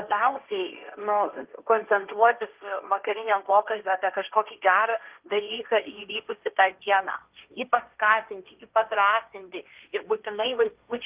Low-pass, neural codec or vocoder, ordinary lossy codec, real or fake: 3.6 kHz; codec, 16 kHz, 1.1 kbps, Voila-Tokenizer; Opus, 32 kbps; fake